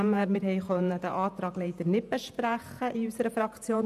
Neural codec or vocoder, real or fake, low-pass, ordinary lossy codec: vocoder, 48 kHz, 128 mel bands, Vocos; fake; 14.4 kHz; AAC, 96 kbps